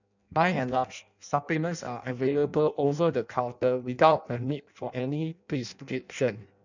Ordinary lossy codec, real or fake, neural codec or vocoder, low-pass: none; fake; codec, 16 kHz in and 24 kHz out, 0.6 kbps, FireRedTTS-2 codec; 7.2 kHz